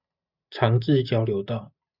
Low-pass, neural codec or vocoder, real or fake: 5.4 kHz; none; real